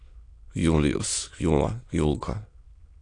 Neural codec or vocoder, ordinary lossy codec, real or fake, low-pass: autoencoder, 22.05 kHz, a latent of 192 numbers a frame, VITS, trained on many speakers; AAC, 48 kbps; fake; 9.9 kHz